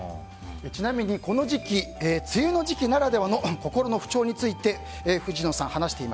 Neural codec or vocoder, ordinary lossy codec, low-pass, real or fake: none; none; none; real